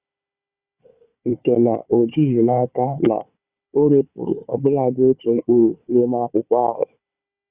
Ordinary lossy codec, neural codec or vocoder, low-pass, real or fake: Opus, 64 kbps; codec, 16 kHz, 4 kbps, FunCodec, trained on Chinese and English, 50 frames a second; 3.6 kHz; fake